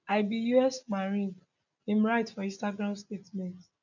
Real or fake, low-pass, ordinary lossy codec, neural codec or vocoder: real; 7.2 kHz; none; none